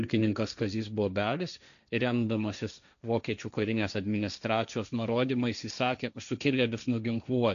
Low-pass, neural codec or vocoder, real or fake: 7.2 kHz; codec, 16 kHz, 1.1 kbps, Voila-Tokenizer; fake